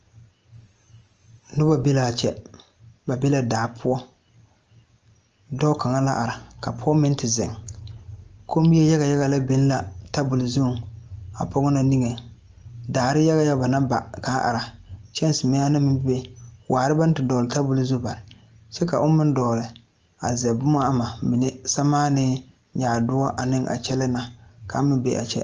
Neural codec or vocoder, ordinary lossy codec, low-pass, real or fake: none; Opus, 24 kbps; 7.2 kHz; real